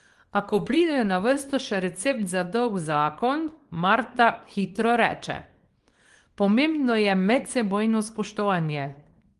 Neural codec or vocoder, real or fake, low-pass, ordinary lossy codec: codec, 24 kHz, 0.9 kbps, WavTokenizer, small release; fake; 10.8 kHz; Opus, 24 kbps